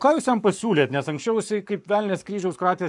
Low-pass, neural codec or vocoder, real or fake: 10.8 kHz; codec, 44.1 kHz, 7.8 kbps, Pupu-Codec; fake